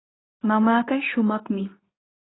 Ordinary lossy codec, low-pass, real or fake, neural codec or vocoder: AAC, 16 kbps; 7.2 kHz; fake; codec, 24 kHz, 0.9 kbps, WavTokenizer, medium speech release version 2